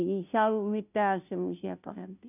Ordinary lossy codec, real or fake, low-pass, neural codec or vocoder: none; fake; 3.6 kHz; codec, 16 kHz, 0.5 kbps, FunCodec, trained on Chinese and English, 25 frames a second